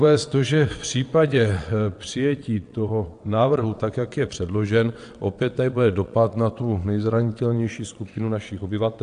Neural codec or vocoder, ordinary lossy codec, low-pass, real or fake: vocoder, 22.05 kHz, 80 mel bands, WaveNeXt; AAC, 64 kbps; 9.9 kHz; fake